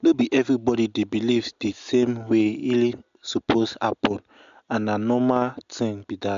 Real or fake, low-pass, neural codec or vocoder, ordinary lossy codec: real; 7.2 kHz; none; MP3, 64 kbps